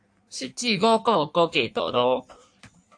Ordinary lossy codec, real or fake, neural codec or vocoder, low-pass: AAC, 64 kbps; fake; codec, 16 kHz in and 24 kHz out, 1.1 kbps, FireRedTTS-2 codec; 9.9 kHz